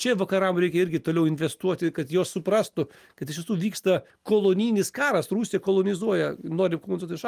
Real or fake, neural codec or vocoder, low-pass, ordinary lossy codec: real; none; 14.4 kHz; Opus, 32 kbps